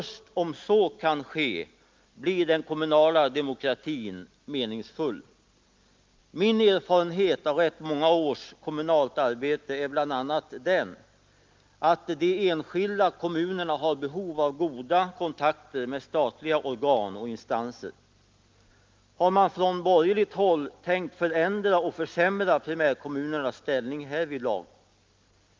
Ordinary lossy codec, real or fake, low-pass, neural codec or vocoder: Opus, 24 kbps; real; 7.2 kHz; none